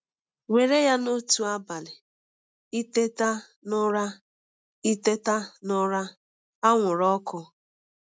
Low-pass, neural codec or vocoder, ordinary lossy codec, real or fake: none; none; none; real